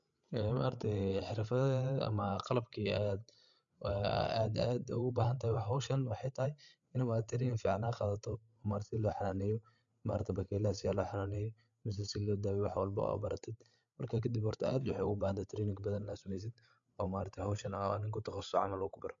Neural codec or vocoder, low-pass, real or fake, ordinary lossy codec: codec, 16 kHz, 8 kbps, FreqCodec, larger model; 7.2 kHz; fake; MP3, 64 kbps